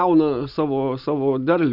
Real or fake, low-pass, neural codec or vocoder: real; 5.4 kHz; none